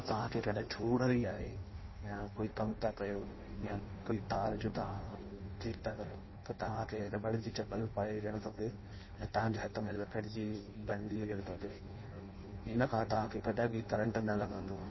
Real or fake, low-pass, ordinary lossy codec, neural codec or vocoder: fake; 7.2 kHz; MP3, 24 kbps; codec, 16 kHz in and 24 kHz out, 0.6 kbps, FireRedTTS-2 codec